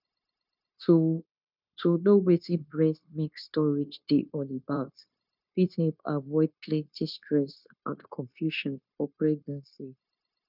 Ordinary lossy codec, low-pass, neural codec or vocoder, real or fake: none; 5.4 kHz; codec, 16 kHz, 0.9 kbps, LongCat-Audio-Codec; fake